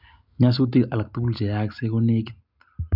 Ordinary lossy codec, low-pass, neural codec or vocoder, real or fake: none; 5.4 kHz; none; real